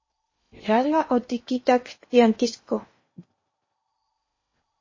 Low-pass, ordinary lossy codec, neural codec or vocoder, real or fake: 7.2 kHz; MP3, 32 kbps; codec, 16 kHz in and 24 kHz out, 0.8 kbps, FocalCodec, streaming, 65536 codes; fake